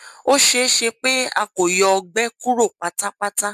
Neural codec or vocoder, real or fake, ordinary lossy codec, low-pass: none; real; MP3, 96 kbps; 14.4 kHz